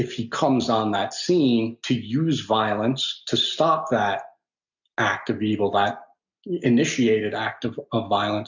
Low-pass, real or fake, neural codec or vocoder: 7.2 kHz; real; none